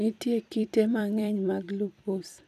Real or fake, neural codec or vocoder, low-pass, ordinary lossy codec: fake; vocoder, 44.1 kHz, 128 mel bands every 256 samples, BigVGAN v2; 14.4 kHz; none